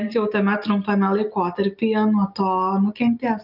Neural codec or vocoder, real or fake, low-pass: none; real; 5.4 kHz